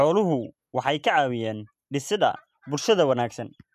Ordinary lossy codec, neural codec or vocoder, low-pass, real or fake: MP3, 96 kbps; none; 14.4 kHz; real